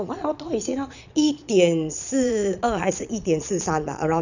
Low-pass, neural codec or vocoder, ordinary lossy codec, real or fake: 7.2 kHz; vocoder, 22.05 kHz, 80 mel bands, Vocos; none; fake